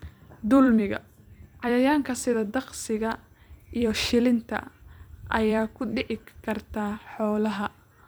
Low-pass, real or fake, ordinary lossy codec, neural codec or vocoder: none; fake; none; vocoder, 44.1 kHz, 128 mel bands every 256 samples, BigVGAN v2